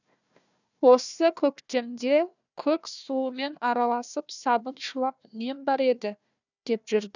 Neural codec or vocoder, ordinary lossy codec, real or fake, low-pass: codec, 16 kHz, 1 kbps, FunCodec, trained on Chinese and English, 50 frames a second; none; fake; 7.2 kHz